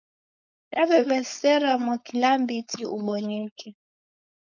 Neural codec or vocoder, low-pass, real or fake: codec, 16 kHz, 4.8 kbps, FACodec; 7.2 kHz; fake